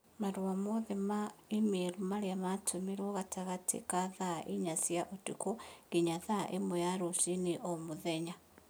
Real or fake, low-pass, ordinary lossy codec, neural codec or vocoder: real; none; none; none